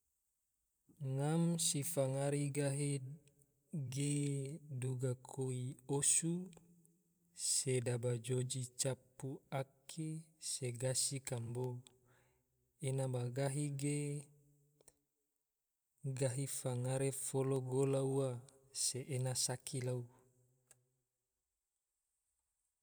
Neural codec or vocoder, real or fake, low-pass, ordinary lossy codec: vocoder, 44.1 kHz, 128 mel bands every 512 samples, BigVGAN v2; fake; none; none